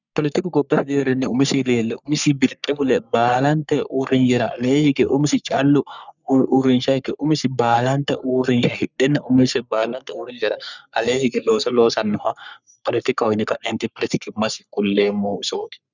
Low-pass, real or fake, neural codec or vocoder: 7.2 kHz; fake; codec, 44.1 kHz, 3.4 kbps, Pupu-Codec